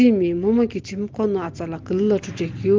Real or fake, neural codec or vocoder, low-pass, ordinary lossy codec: real; none; 7.2 kHz; Opus, 16 kbps